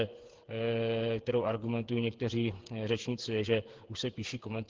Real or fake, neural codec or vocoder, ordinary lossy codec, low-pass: fake; codec, 16 kHz, 8 kbps, FreqCodec, smaller model; Opus, 16 kbps; 7.2 kHz